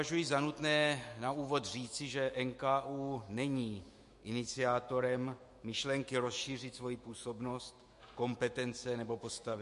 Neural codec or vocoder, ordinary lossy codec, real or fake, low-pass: autoencoder, 48 kHz, 128 numbers a frame, DAC-VAE, trained on Japanese speech; MP3, 48 kbps; fake; 14.4 kHz